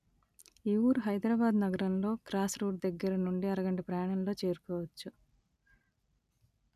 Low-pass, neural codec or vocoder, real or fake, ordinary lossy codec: 14.4 kHz; none; real; none